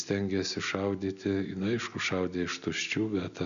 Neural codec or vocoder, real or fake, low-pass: none; real; 7.2 kHz